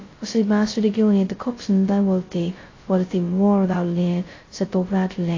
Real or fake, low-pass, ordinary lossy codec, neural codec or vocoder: fake; 7.2 kHz; AAC, 32 kbps; codec, 16 kHz, 0.2 kbps, FocalCodec